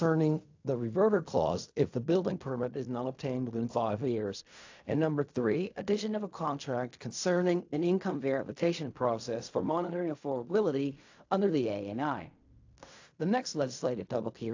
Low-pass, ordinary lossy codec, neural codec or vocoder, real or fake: 7.2 kHz; AAC, 48 kbps; codec, 16 kHz in and 24 kHz out, 0.4 kbps, LongCat-Audio-Codec, fine tuned four codebook decoder; fake